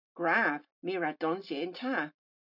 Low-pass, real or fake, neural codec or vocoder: 5.4 kHz; real; none